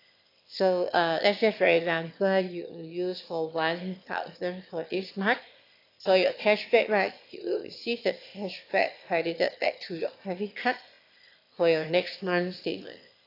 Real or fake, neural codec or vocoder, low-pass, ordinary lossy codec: fake; autoencoder, 22.05 kHz, a latent of 192 numbers a frame, VITS, trained on one speaker; 5.4 kHz; AAC, 32 kbps